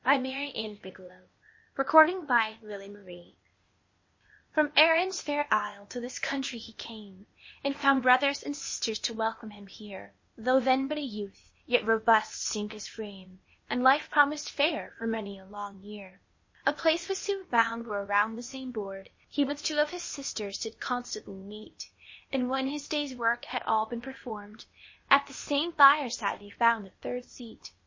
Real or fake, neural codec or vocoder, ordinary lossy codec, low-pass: fake; codec, 16 kHz, 0.8 kbps, ZipCodec; MP3, 32 kbps; 7.2 kHz